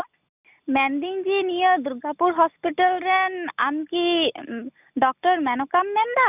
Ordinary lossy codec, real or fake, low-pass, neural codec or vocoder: none; real; 3.6 kHz; none